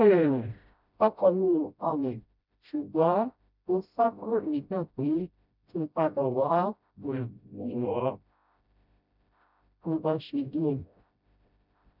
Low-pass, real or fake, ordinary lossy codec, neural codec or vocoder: 5.4 kHz; fake; none; codec, 16 kHz, 0.5 kbps, FreqCodec, smaller model